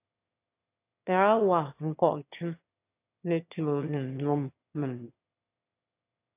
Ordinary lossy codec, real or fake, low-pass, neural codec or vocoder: AAC, 24 kbps; fake; 3.6 kHz; autoencoder, 22.05 kHz, a latent of 192 numbers a frame, VITS, trained on one speaker